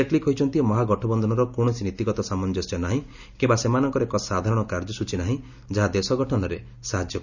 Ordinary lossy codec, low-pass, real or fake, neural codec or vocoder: none; 7.2 kHz; real; none